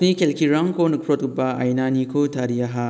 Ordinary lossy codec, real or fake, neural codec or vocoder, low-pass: none; real; none; none